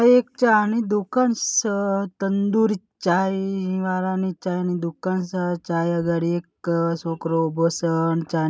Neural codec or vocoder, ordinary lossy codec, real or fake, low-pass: none; none; real; none